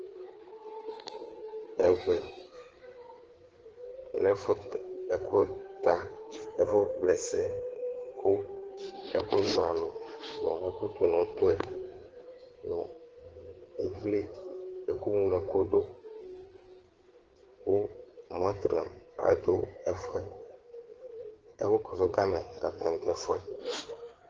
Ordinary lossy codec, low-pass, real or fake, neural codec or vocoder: Opus, 16 kbps; 7.2 kHz; fake; codec, 16 kHz, 4 kbps, FreqCodec, larger model